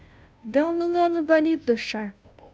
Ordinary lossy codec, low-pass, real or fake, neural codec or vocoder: none; none; fake; codec, 16 kHz, 0.5 kbps, FunCodec, trained on Chinese and English, 25 frames a second